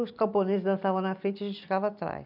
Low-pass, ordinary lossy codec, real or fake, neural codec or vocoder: 5.4 kHz; none; real; none